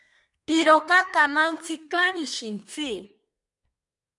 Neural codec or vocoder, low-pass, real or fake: codec, 24 kHz, 1 kbps, SNAC; 10.8 kHz; fake